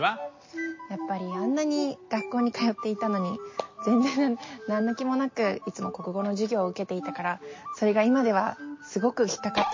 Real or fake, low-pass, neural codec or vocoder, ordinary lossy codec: real; 7.2 kHz; none; MP3, 32 kbps